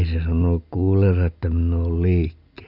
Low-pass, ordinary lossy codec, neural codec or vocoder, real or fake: 5.4 kHz; none; none; real